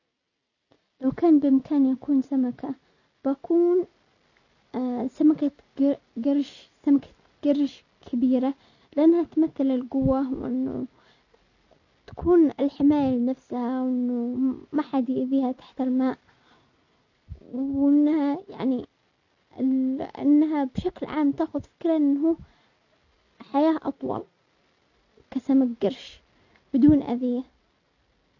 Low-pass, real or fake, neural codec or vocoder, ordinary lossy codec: 7.2 kHz; real; none; none